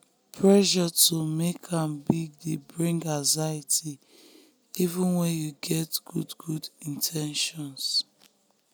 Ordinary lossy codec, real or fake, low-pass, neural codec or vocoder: none; real; none; none